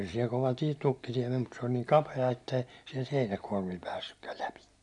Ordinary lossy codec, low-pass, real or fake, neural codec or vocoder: none; 10.8 kHz; real; none